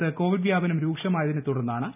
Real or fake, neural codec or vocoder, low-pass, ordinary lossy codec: real; none; 3.6 kHz; none